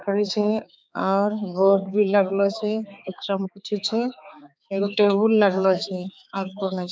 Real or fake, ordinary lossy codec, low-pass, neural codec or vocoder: fake; none; none; codec, 16 kHz, 4 kbps, X-Codec, HuBERT features, trained on balanced general audio